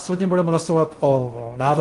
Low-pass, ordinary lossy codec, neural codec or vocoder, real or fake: 10.8 kHz; Opus, 24 kbps; codec, 16 kHz in and 24 kHz out, 0.6 kbps, FocalCodec, streaming, 2048 codes; fake